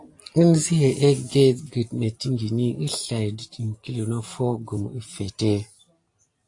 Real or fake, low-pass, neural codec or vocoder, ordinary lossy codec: real; 10.8 kHz; none; AAC, 48 kbps